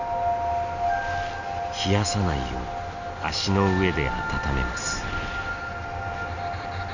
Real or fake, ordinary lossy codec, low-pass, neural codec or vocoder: real; none; 7.2 kHz; none